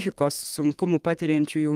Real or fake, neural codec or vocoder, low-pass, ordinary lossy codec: fake; codec, 32 kHz, 1.9 kbps, SNAC; 14.4 kHz; Opus, 32 kbps